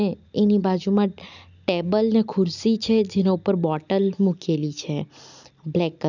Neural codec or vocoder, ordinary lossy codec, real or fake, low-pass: none; none; real; 7.2 kHz